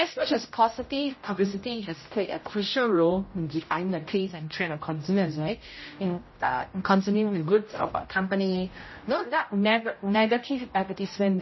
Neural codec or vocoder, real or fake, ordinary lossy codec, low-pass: codec, 16 kHz, 0.5 kbps, X-Codec, HuBERT features, trained on general audio; fake; MP3, 24 kbps; 7.2 kHz